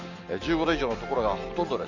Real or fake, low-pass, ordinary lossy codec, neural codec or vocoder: real; 7.2 kHz; none; none